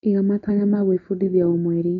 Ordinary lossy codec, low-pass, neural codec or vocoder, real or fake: AAC, 32 kbps; 7.2 kHz; none; real